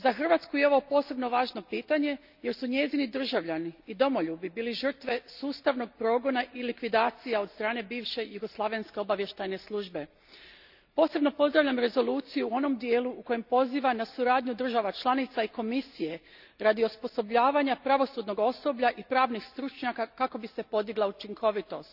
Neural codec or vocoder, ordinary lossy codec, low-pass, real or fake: none; none; 5.4 kHz; real